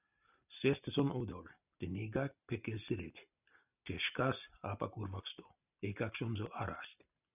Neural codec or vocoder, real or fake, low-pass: none; real; 3.6 kHz